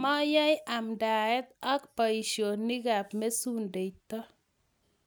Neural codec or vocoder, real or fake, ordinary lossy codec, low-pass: none; real; none; none